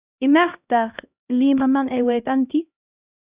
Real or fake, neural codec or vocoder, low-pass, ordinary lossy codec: fake; codec, 16 kHz, 1 kbps, X-Codec, HuBERT features, trained on LibriSpeech; 3.6 kHz; Opus, 64 kbps